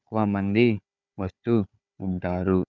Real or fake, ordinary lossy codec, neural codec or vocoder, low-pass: fake; none; codec, 16 kHz, 4 kbps, FunCodec, trained on Chinese and English, 50 frames a second; 7.2 kHz